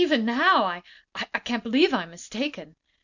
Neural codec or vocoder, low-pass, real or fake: none; 7.2 kHz; real